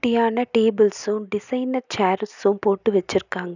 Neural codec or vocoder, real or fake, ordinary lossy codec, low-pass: none; real; none; 7.2 kHz